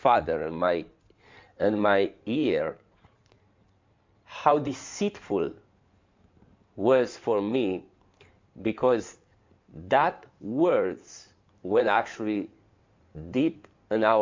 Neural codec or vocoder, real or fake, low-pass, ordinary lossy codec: codec, 16 kHz in and 24 kHz out, 2.2 kbps, FireRedTTS-2 codec; fake; 7.2 kHz; MP3, 64 kbps